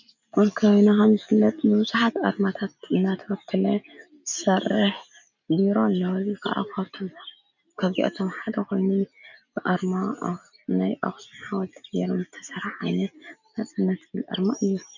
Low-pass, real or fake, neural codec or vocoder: 7.2 kHz; real; none